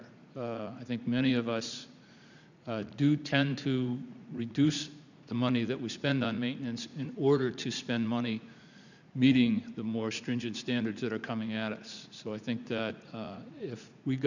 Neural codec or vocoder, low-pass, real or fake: vocoder, 44.1 kHz, 80 mel bands, Vocos; 7.2 kHz; fake